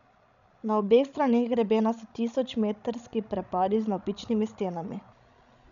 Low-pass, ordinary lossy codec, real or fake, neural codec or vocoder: 7.2 kHz; none; fake; codec, 16 kHz, 16 kbps, FreqCodec, larger model